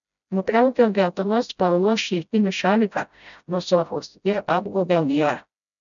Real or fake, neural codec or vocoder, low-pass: fake; codec, 16 kHz, 0.5 kbps, FreqCodec, smaller model; 7.2 kHz